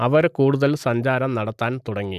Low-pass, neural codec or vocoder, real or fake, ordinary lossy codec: 14.4 kHz; none; real; none